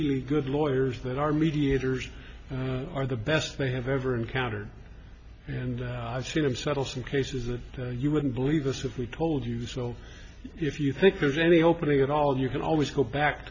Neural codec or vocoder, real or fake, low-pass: none; real; 7.2 kHz